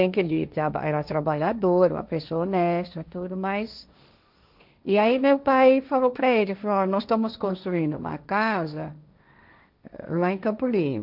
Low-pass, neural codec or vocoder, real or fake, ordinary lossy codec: 5.4 kHz; codec, 16 kHz, 1.1 kbps, Voila-Tokenizer; fake; none